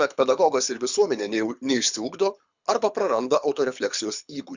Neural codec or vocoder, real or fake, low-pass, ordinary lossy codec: codec, 24 kHz, 6 kbps, HILCodec; fake; 7.2 kHz; Opus, 64 kbps